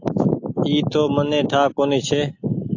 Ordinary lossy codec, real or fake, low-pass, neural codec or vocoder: AAC, 48 kbps; real; 7.2 kHz; none